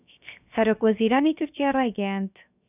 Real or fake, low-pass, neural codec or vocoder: fake; 3.6 kHz; codec, 16 kHz, 0.7 kbps, FocalCodec